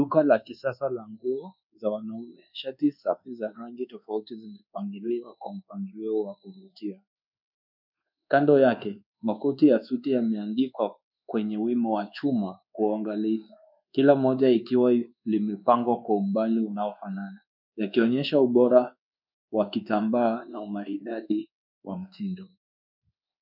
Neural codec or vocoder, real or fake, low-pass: codec, 24 kHz, 1.2 kbps, DualCodec; fake; 5.4 kHz